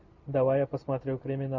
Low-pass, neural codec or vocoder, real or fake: 7.2 kHz; none; real